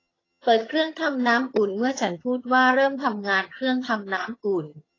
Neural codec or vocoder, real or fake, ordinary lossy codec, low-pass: vocoder, 22.05 kHz, 80 mel bands, HiFi-GAN; fake; AAC, 32 kbps; 7.2 kHz